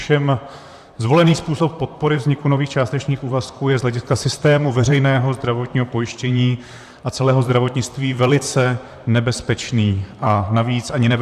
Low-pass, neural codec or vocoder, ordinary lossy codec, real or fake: 14.4 kHz; vocoder, 44.1 kHz, 128 mel bands, Pupu-Vocoder; AAC, 96 kbps; fake